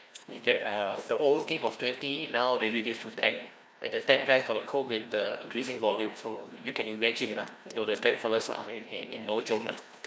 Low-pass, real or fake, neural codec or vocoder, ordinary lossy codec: none; fake; codec, 16 kHz, 1 kbps, FreqCodec, larger model; none